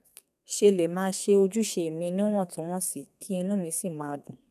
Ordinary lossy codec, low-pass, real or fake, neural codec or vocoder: none; 14.4 kHz; fake; codec, 32 kHz, 1.9 kbps, SNAC